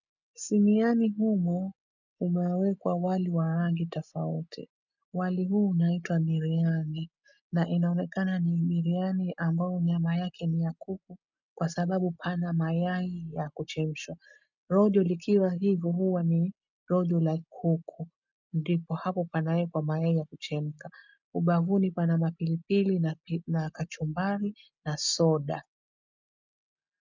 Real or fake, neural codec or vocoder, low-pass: real; none; 7.2 kHz